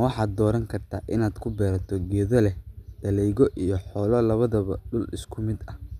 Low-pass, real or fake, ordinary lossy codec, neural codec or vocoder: 14.4 kHz; real; none; none